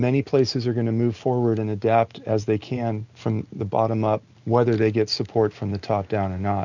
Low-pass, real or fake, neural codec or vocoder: 7.2 kHz; real; none